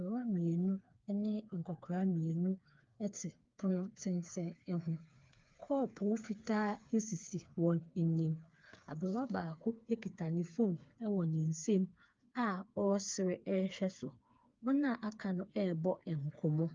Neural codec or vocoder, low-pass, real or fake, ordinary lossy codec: codec, 16 kHz, 4 kbps, FreqCodec, smaller model; 7.2 kHz; fake; Opus, 32 kbps